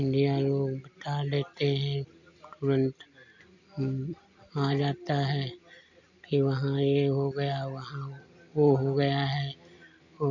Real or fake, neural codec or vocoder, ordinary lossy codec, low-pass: real; none; none; 7.2 kHz